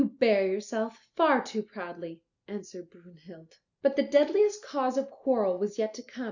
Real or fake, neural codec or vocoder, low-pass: real; none; 7.2 kHz